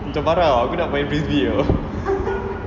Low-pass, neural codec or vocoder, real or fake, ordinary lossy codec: 7.2 kHz; none; real; none